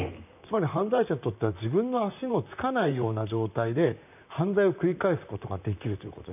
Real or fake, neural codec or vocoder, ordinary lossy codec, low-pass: fake; vocoder, 44.1 kHz, 128 mel bands, Pupu-Vocoder; none; 3.6 kHz